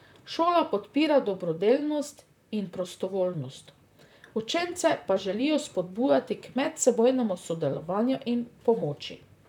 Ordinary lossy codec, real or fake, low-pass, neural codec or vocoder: none; fake; 19.8 kHz; vocoder, 44.1 kHz, 128 mel bands, Pupu-Vocoder